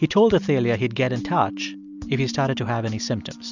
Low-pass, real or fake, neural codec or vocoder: 7.2 kHz; real; none